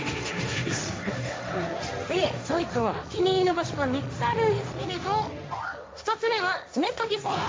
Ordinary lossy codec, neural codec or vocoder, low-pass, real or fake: none; codec, 16 kHz, 1.1 kbps, Voila-Tokenizer; none; fake